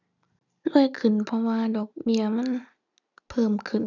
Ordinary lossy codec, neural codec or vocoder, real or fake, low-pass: none; none; real; 7.2 kHz